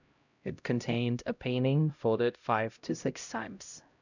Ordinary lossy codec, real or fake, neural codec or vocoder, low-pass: none; fake; codec, 16 kHz, 0.5 kbps, X-Codec, HuBERT features, trained on LibriSpeech; 7.2 kHz